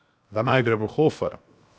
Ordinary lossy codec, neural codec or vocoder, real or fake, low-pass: none; codec, 16 kHz, 0.7 kbps, FocalCodec; fake; none